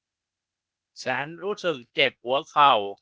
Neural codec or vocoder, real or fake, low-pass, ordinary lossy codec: codec, 16 kHz, 0.8 kbps, ZipCodec; fake; none; none